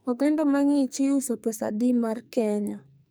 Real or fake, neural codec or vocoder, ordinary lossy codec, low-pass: fake; codec, 44.1 kHz, 2.6 kbps, SNAC; none; none